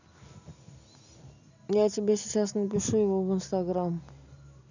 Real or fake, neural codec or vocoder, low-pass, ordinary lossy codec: real; none; 7.2 kHz; none